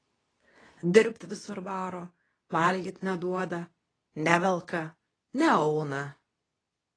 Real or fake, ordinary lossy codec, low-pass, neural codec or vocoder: fake; AAC, 32 kbps; 9.9 kHz; codec, 24 kHz, 0.9 kbps, WavTokenizer, medium speech release version 2